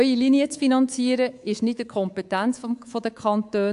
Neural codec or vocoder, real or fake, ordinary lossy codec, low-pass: codec, 24 kHz, 3.1 kbps, DualCodec; fake; AAC, 64 kbps; 10.8 kHz